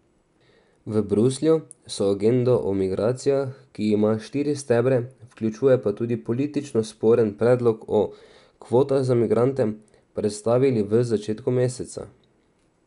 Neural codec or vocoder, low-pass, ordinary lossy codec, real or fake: none; 10.8 kHz; none; real